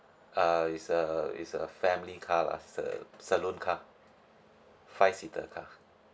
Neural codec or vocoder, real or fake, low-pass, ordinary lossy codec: none; real; none; none